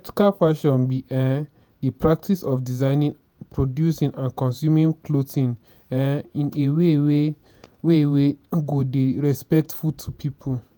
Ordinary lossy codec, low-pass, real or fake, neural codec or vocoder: none; none; fake; vocoder, 48 kHz, 128 mel bands, Vocos